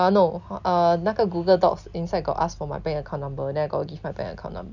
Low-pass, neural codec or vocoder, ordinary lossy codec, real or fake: 7.2 kHz; none; none; real